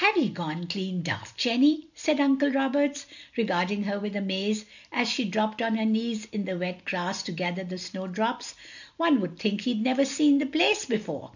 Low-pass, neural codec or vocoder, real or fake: 7.2 kHz; none; real